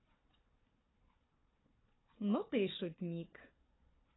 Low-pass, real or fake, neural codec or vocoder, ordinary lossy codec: 7.2 kHz; fake; codec, 16 kHz, 4 kbps, FunCodec, trained on Chinese and English, 50 frames a second; AAC, 16 kbps